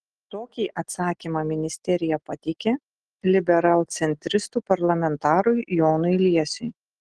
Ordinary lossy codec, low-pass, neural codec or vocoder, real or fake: Opus, 16 kbps; 10.8 kHz; none; real